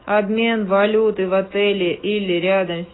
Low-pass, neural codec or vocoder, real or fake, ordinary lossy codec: 7.2 kHz; autoencoder, 48 kHz, 128 numbers a frame, DAC-VAE, trained on Japanese speech; fake; AAC, 16 kbps